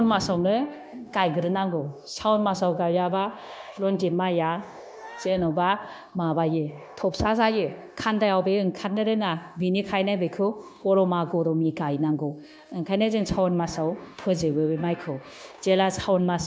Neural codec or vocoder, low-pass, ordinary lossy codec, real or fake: codec, 16 kHz, 0.9 kbps, LongCat-Audio-Codec; none; none; fake